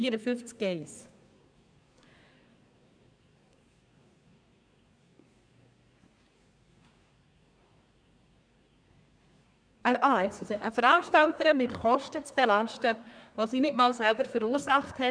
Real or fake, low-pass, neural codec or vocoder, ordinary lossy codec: fake; 9.9 kHz; codec, 24 kHz, 1 kbps, SNAC; none